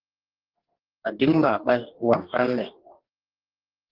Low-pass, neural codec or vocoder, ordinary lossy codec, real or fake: 5.4 kHz; codec, 44.1 kHz, 2.6 kbps, DAC; Opus, 16 kbps; fake